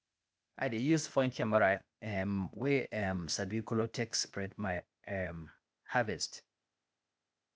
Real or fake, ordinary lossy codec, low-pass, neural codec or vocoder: fake; none; none; codec, 16 kHz, 0.8 kbps, ZipCodec